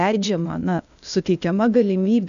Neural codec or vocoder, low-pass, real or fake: codec, 16 kHz, 0.8 kbps, ZipCodec; 7.2 kHz; fake